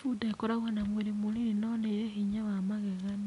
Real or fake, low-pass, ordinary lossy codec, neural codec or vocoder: real; 10.8 kHz; none; none